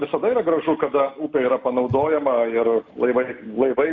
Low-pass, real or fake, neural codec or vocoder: 7.2 kHz; real; none